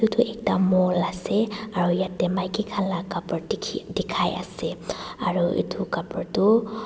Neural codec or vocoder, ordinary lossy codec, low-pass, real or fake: none; none; none; real